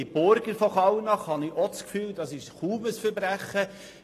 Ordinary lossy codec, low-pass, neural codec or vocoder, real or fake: AAC, 48 kbps; 14.4 kHz; none; real